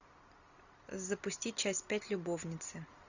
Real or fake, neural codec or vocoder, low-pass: real; none; 7.2 kHz